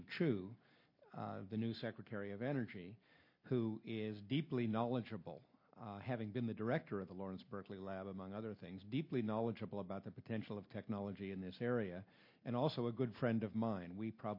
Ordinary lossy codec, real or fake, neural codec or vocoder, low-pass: MP3, 32 kbps; real; none; 5.4 kHz